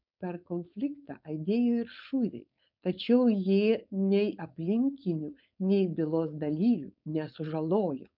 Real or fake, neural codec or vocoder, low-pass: fake; codec, 16 kHz, 4.8 kbps, FACodec; 5.4 kHz